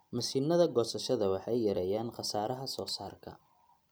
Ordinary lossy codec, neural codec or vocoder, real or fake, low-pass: none; none; real; none